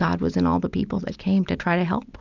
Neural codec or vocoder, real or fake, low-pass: none; real; 7.2 kHz